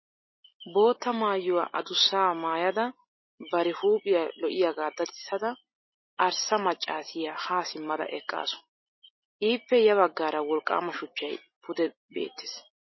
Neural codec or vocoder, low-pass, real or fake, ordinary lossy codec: none; 7.2 kHz; real; MP3, 24 kbps